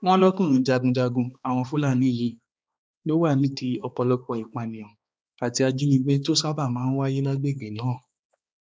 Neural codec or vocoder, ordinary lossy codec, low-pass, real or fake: codec, 16 kHz, 2 kbps, X-Codec, HuBERT features, trained on balanced general audio; none; none; fake